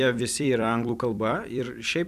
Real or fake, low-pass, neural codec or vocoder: fake; 14.4 kHz; vocoder, 44.1 kHz, 128 mel bands every 256 samples, BigVGAN v2